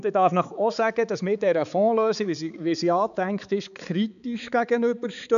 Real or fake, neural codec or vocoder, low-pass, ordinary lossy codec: fake; codec, 16 kHz, 4 kbps, X-Codec, HuBERT features, trained on balanced general audio; 7.2 kHz; none